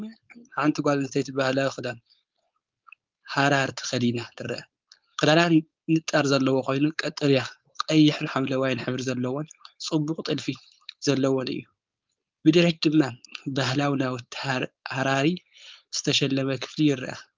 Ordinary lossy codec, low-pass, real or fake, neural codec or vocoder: Opus, 32 kbps; 7.2 kHz; fake; codec, 16 kHz, 4.8 kbps, FACodec